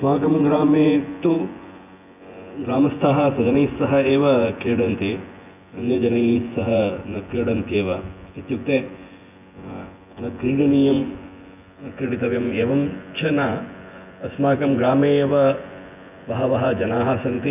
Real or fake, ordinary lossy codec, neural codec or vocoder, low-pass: fake; none; vocoder, 24 kHz, 100 mel bands, Vocos; 3.6 kHz